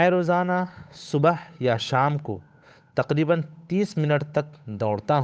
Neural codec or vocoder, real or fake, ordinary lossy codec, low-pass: codec, 16 kHz, 8 kbps, FunCodec, trained on Chinese and English, 25 frames a second; fake; none; none